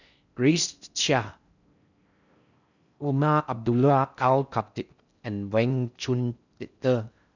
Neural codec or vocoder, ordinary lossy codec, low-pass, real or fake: codec, 16 kHz in and 24 kHz out, 0.6 kbps, FocalCodec, streaming, 4096 codes; none; 7.2 kHz; fake